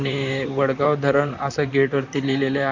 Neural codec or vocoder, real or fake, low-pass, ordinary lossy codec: vocoder, 44.1 kHz, 128 mel bands, Pupu-Vocoder; fake; 7.2 kHz; none